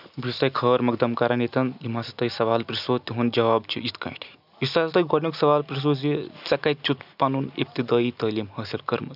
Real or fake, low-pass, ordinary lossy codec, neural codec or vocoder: real; 5.4 kHz; none; none